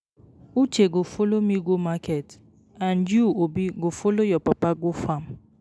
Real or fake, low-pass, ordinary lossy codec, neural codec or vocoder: real; none; none; none